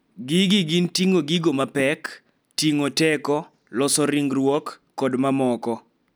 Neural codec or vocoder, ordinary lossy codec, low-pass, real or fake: none; none; none; real